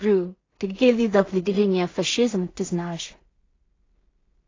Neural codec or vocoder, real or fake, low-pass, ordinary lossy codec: codec, 16 kHz in and 24 kHz out, 0.4 kbps, LongCat-Audio-Codec, two codebook decoder; fake; 7.2 kHz; AAC, 32 kbps